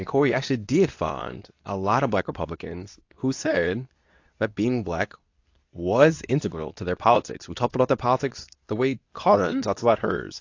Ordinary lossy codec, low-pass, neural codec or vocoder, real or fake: AAC, 48 kbps; 7.2 kHz; codec, 24 kHz, 0.9 kbps, WavTokenizer, medium speech release version 2; fake